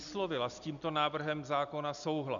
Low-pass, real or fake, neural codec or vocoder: 7.2 kHz; real; none